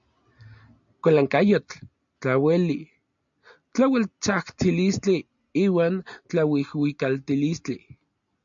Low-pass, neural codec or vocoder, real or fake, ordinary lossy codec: 7.2 kHz; none; real; MP3, 64 kbps